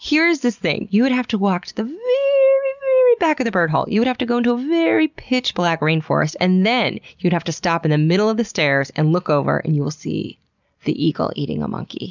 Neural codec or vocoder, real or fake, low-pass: none; real; 7.2 kHz